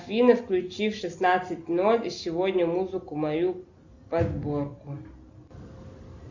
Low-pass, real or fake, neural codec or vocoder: 7.2 kHz; real; none